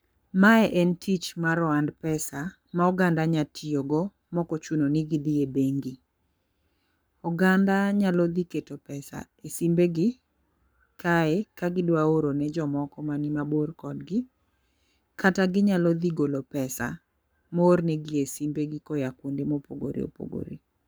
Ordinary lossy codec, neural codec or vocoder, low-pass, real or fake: none; codec, 44.1 kHz, 7.8 kbps, Pupu-Codec; none; fake